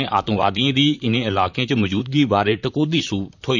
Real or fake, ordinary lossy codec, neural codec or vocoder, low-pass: fake; none; vocoder, 44.1 kHz, 128 mel bands, Pupu-Vocoder; 7.2 kHz